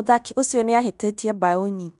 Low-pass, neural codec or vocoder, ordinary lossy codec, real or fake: 10.8 kHz; codec, 16 kHz in and 24 kHz out, 0.9 kbps, LongCat-Audio-Codec, fine tuned four codebook decoder; none; fake